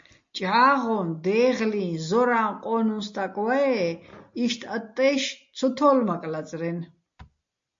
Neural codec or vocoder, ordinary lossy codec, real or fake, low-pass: none; MP3, 48 kbps; real; 7.2 kHz